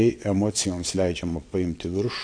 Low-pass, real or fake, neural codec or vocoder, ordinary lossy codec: 9.9 kHz; fake; vocoder, 22.05 kHz, 80 mel bands, WaveNeXt; AAC, 48 kbps